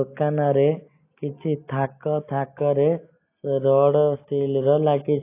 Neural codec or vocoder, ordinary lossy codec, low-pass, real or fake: none; AAC, 24 kbps; 3.6 kHz; real